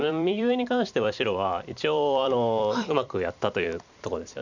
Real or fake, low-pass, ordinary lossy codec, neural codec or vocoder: fake; 7.2 kHz; none; vocoder, 44.1 kHz, 128 mel bands every 256 samples, BigVGAN v2